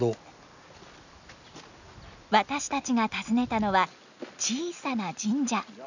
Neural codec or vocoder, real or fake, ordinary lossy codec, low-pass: none; real; none; 7.2 kHz